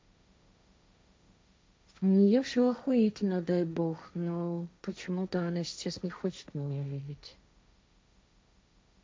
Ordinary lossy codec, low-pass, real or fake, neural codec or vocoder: none; none; fake; codec, 16 kHz, 1.1 kbps, Voila-Tokenizer